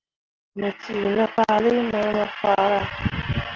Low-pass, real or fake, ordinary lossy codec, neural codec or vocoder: 7.2 kHz; real; Opus, 16 kbps; none